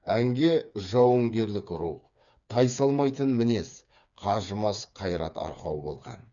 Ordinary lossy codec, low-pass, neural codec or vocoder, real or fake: none; 7.2 kHz; codec, 16 kHz, 4 kbps, FreqCodec, smaller model; fake